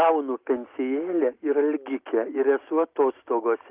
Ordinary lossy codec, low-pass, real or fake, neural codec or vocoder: Opus, 32 kbps; 3.6 kHz; real; none